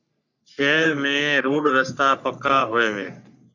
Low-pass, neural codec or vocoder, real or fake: 7.2 kHz; codec, 44.1 kHz, 3.4 kbps, Pupu-Codec; fake